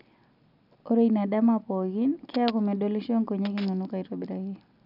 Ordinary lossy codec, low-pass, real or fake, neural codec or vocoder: none; 5.4 kHz; real; none